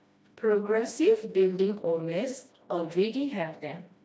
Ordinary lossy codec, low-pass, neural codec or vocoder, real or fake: none; none; codec, 16 kHz, 1 kbps, FreqCodec, smaller model; fake